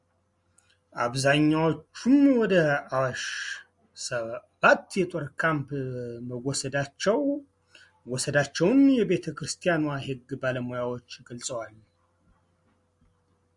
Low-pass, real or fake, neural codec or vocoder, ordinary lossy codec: 10.8 kHz; real; none; Opus, 64 kbps